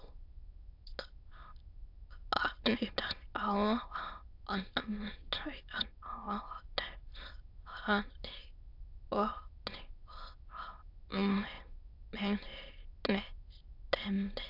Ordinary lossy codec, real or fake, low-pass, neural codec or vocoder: AAC, 48 kbps; fake; 5.4 kHz; autoencoder, 22.05 kHz, a latent of 192 numbers a frame, VITS, trained on many speakers